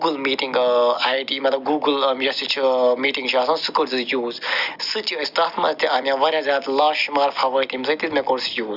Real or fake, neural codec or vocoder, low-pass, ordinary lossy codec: real; none; 5.4 kHz; Opus, 64 kbps